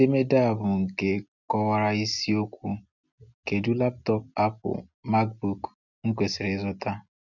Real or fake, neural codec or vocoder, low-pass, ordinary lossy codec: real; none; 7.2 kHz; none